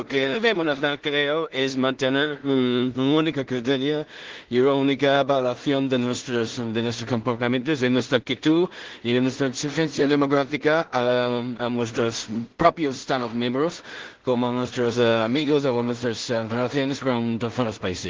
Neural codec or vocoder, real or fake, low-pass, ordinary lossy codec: codec, 16 kHz in and 24 kHz out, 0.4 kbps, LongCat-Audio-Codec, two codebook decoder; fake; 7.2 kHz; Opus, 16 kbps